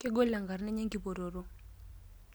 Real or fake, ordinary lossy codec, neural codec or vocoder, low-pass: real; none; none; none